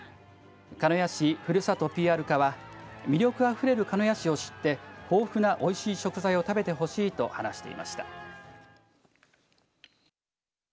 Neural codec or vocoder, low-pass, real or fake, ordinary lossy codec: none; none; real; none